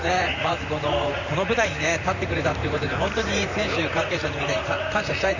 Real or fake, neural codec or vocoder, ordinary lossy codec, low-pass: fake; vocoder, 44.1 kHz, 128 mel bands, Pupu-Vocoder; none; 7.2 kHz